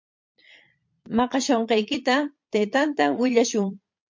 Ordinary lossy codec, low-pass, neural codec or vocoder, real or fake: MP3, 48 kbps; 7.2 kHz; vocoder, 22.05 kHz, 80 mel bands, Vocos; fake